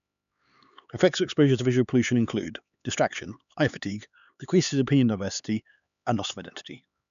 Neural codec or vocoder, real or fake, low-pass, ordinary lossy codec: codec, 16 kHz, 4 kbps, X-Codec, HuBERT features, trained on LibriSpeech; fake; 7.2 kHz; none